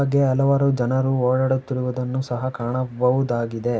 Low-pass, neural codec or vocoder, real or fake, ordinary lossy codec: none; none; real; none